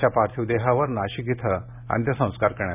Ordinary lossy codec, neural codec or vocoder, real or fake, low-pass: none; none; real; 3.6 kHz